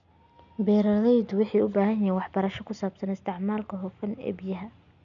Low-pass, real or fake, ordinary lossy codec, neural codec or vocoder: 7.2 kHz; real; none; none